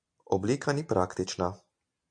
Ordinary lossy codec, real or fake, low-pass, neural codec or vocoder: MP3, 64 kbps; real; 9.9 kHz; none